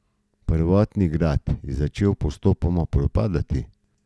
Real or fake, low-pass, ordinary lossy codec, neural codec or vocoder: real; none; none; none